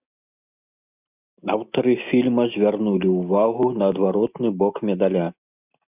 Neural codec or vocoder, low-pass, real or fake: none; 3.6 kHz; real